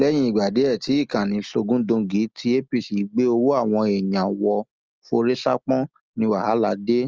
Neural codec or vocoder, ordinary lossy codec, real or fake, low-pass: none; Opus, 24 kbps; real; 7.2 kHz